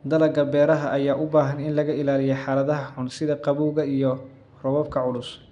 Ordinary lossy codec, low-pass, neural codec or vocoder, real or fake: none; 10.8 kHz; none; real